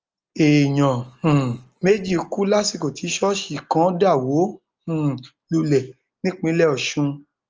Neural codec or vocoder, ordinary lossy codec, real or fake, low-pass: none; Opus, 24 kbps; real; 7.2 kHz